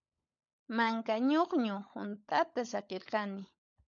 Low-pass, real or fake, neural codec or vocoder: 7.2 kHz; fake; codec, 16 kHz, 16 kbps, FunCodec, trained on LibriTTS, 50 frames a second